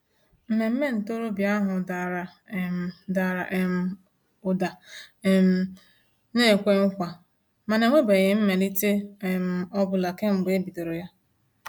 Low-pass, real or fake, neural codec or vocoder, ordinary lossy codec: 19.8 kHz; real; none; MP3, 96 kbps